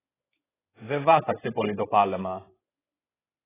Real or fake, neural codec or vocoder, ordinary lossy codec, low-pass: fake; codec, 16 kHz in and 24 kHz out, 1 kbps, XY-Tokenizer; AAC, 16 kbps; 3.6 kHz